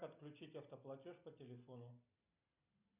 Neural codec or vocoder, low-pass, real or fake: none; 3.6 kHz; real